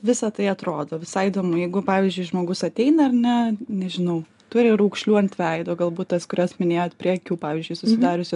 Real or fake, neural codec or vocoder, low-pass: real; none; 10.8 kHz